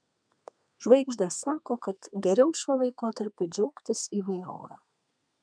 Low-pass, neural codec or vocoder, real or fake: 9.9 kHz; codec, 32 kHz, 1.9 kbps, SNAC; fake